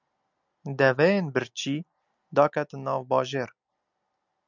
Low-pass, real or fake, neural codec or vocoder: 7.2 kHz; real; none